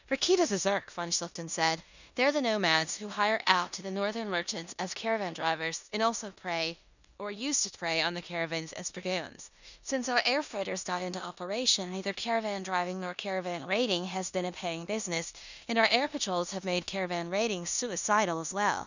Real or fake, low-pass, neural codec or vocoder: fake; 7.2 kHz; codec, 16 kHz in and 24 kHz out, 0.9 kbps, LongCat-Audio-Codec, four codebook decoder